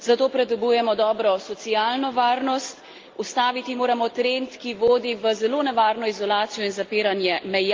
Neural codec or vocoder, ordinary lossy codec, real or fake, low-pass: none; Opus, 32 kbps; real; 7.2 kHz